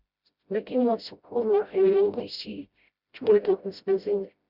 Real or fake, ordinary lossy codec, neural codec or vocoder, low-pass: fake; Opus, 64 kbps; codec, 16 kHz, 0.5 kbps, FreqCodec, smaller model; 5.4 kHz